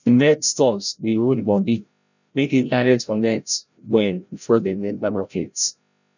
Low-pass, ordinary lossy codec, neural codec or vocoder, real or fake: 7.2 kHz; none; codec, 16 kHz, 0.5 kbps, FreqCodec, larger model; fake